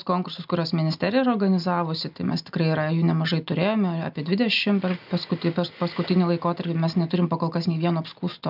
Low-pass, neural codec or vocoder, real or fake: 5.4 kHz; none; real